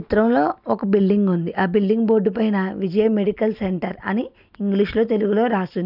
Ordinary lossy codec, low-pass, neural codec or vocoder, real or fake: none; 5.4 kHz; none; real